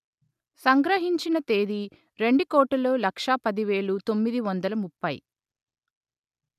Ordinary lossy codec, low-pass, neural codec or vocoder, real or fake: none; 14.4 kHz; none; real